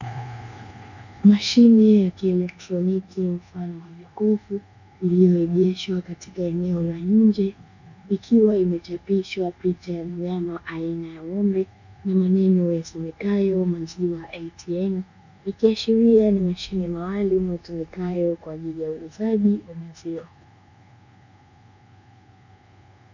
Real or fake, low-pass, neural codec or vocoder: fake; 7.2 kHz; codec, 24 kHz, 1.2 kbps, DualCodec